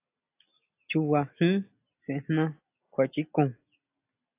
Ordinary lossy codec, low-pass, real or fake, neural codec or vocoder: AAC, 24 kbps; 3.6 kHz; real; none